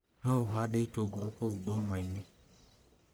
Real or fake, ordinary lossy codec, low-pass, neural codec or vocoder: fake; none; none; codec, 44.1 kHz, 1.7 kbps, Pupu-Codec